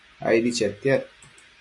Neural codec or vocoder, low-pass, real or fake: none; 10.8 kHz; real